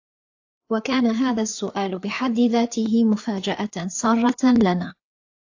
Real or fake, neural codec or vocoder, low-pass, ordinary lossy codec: fake; codec, 16 kHz, 4 kbps, FreqCodec, larger model; 7.2 kHz; AAC, 48 kbps